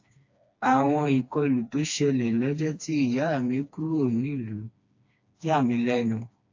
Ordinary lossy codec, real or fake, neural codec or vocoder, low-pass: AAC, 48 kbps; fake; codec, 16 kHz, 2 kbps, FreqCodec, smaller model; 7.2 kHz